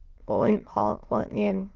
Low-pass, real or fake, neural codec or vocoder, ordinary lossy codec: 7.2 kHz; fake; autoencoder, 22.05 kHz, a latent of 192 numbers a frame, VITS, trained on many speakers; Opus, 16 kbps